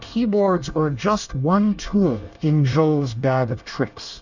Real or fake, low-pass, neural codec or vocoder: fake; 7.2 kHz; codec, 24 kHz, 1 kbps, SNAC